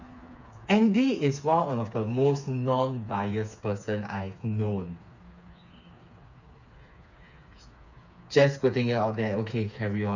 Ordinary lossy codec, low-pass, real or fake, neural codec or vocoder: none; 7.2 kHz; fake; codec, 16 kHz, 4 kbps, FreqCodec, smaller model